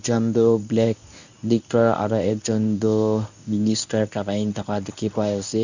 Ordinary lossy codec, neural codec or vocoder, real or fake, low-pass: none; codec, 24 kHz, 0.9 kbps, WavTokenizer, medium speech release version 1; fake; 7.2 kHz